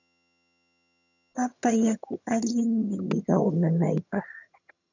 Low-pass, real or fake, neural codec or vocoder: 7.2 kHz; fake; vocoder, 22.05 kHz, 80 mel bands, HiFi-GAN